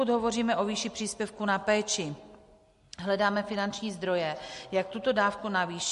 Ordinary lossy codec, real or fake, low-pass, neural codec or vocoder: MP3, 48 kbps; real; 14.4 kHz; none